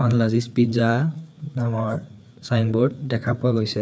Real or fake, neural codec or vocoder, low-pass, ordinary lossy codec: fake; codec, 16 kHz, 4 kbps, FreqCodec, larger model; none; none